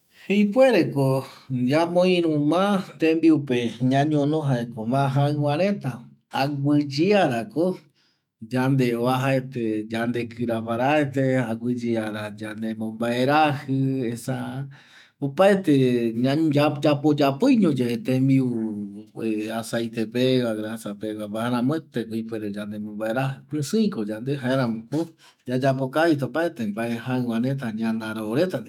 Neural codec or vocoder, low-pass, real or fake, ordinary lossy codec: autoencoder, 48 kHz, 128 numbers a frame, DAC-VAE, trained on Japanese speech; 19.8 kHz; fake; none